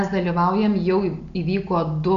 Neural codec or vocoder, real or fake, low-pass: none; real; 7.2 kHz